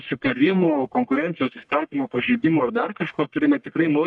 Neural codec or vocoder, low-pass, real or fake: codec, 44.1 kHz, 1.7 kbps, Pupu-Codec; 10.8 kHz; fake